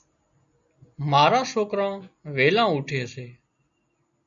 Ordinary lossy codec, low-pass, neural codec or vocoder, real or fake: MP3, 64 kbps; 7.2 kHz; none; real